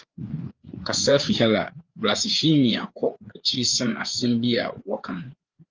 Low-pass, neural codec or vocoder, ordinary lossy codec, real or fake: 7.2 kHz; codec, 16 kHz, 4 kbps, FreqCodec, larger model; Opus, 24 kbps; fake